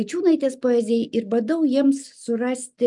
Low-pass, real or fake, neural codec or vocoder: 10.8 kHz; real; none